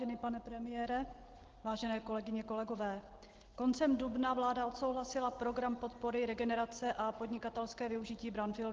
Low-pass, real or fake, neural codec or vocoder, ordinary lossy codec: 7.2 kHz; real; none; Opus, 16 kbps